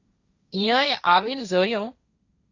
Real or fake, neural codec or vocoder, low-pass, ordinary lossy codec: fake; codec, 16 kHz, 1.1 kbps, Voila-Tokenizer; 7.2 kHz; Opus, 64 kbps